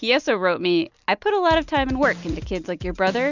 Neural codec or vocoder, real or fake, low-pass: none; real; 7.2 kHz